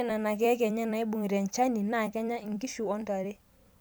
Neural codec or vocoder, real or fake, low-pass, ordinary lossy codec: vocoder, 44.1 kHz, 128 mel bands every 256 samples, BigVGAN v2; fake; none; none